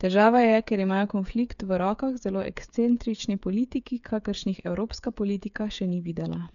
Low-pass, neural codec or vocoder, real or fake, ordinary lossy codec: 7.2 kHz; codec, 16 kHz, 8 kbps, FreqCodec, smaller model; fake; none